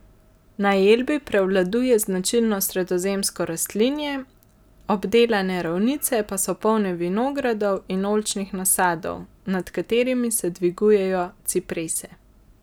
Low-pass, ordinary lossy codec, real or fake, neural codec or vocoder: none; none; real; none